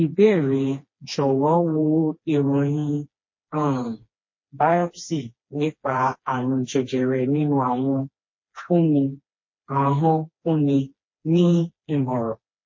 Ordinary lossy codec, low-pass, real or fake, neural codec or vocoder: MP3, 32 kbps; 7.2 kHz; fake; codec, 16 kHz, 2 kbps, FreqCodec, smaller model